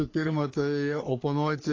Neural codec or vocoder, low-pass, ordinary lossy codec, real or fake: codec, 44.1 kHz, 3.4 kbps, Pupu-Codec; 7.2 kHz; AAC, 32 kbps; fake